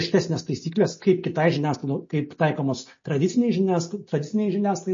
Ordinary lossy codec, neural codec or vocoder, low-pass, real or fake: MP3, 32 kbps; none; 7.2 kHz; real